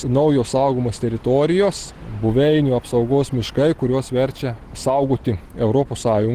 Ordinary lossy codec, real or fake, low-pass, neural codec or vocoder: Opus, 16 kbps; real; 14.4 kHz; none